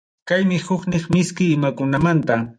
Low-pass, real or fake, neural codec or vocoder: 9.9 kHz; fake; vocoder, 24 kHz, 100 mel bands, Vocos